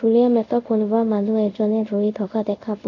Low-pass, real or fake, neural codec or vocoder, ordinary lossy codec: 7.2 kHz; fake; codec, 24 kHz, 0.5 kbps, DualCodec; none